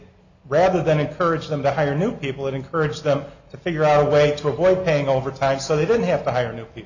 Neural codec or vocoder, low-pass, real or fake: none; 7.2 kHz; real